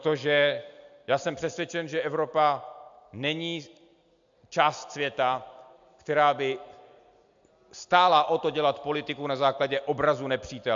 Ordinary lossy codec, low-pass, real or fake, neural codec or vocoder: AAC, 64 kbps; 7.2 kHz; real; none